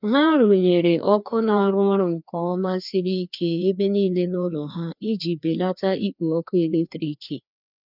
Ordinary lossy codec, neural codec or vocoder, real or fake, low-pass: none; codec, 16 kHz, 2 kbps, FreqCodec, larger model; fake; 5.4 kHz